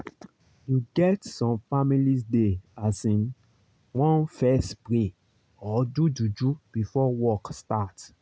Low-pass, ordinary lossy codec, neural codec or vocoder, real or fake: none; none; none; real